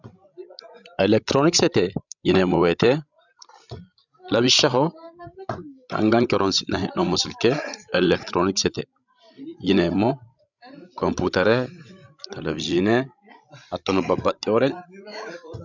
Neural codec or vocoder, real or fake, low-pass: codec, 16 kHz, 16 kbps, FreqCodec, larger model; fake; 7.2 kHz